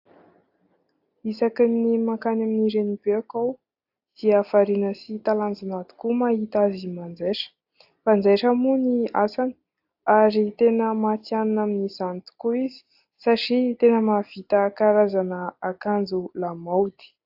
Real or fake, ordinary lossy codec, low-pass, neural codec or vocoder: real; AAC, 48 kbps; 5.4 kHz; none